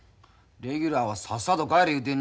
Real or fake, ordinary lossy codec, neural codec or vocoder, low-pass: real; none; none; none